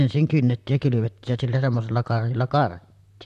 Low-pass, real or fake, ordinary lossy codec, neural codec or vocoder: 14.4 kHz; fake; none; vocoder, 44.1 kHz, 128 mel bands, Pupu-Vocoder